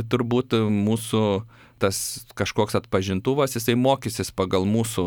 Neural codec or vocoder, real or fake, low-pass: none; real; 19.8 kHz